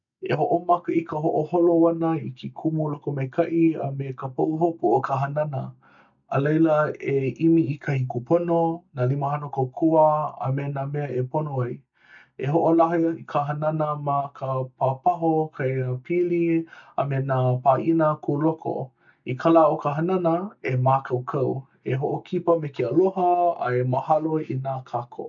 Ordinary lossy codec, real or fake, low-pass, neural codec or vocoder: none; real; 7.2 kHz; none